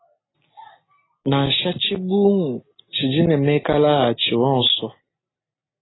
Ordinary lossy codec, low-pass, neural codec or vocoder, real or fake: AAC, 16 kbps; 7.2 kHz; none; real